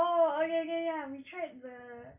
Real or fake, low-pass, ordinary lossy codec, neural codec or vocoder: real; 3.6 kHz; MP3, 32 kbps; none